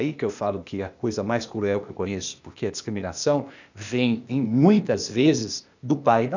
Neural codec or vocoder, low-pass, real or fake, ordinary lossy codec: codec, 16 kHz, 0.8 kbps, ZipCodec; 7.2 kHz; fake; none